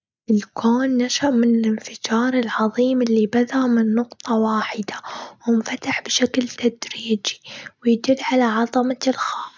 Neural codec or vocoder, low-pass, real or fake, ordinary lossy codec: none; none; real; none